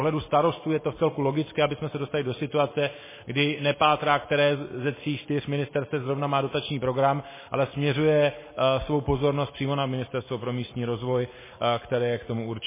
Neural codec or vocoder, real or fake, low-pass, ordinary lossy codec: none; real; 3.6 kHz; MP3, 16 kbps